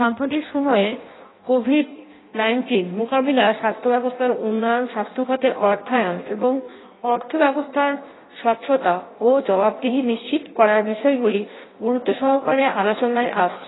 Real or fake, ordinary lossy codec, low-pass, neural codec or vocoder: fake; AAC, 16 kbps; 7.2 kHz; codec, 16 kHz in and 24 kHz out, 0.6 kbps, FireRedTTS-2 codec